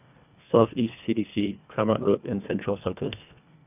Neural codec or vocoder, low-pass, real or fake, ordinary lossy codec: codec, 24 kHz, 1.5 kbps, HILCodec; 3.6 kHz; fake; none